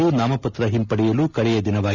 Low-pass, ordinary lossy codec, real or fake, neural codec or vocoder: 7.2 kHz; none; real; none